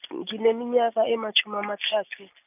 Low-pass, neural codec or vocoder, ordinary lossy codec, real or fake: 3.6 kHz; none; none; real